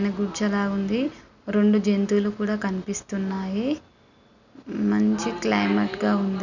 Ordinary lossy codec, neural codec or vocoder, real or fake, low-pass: none; none; real; 7.2 kHz